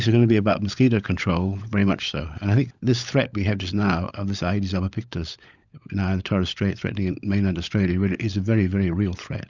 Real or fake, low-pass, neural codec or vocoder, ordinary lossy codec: fake; 7.2 kHz; codec, 16 kHz, 16 kbps, FunCodec, trained on LibriTTS, 50 frames a second; Opus, 64 kbps